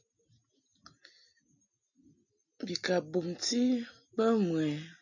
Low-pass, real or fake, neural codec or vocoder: 7.2 kHz; real; none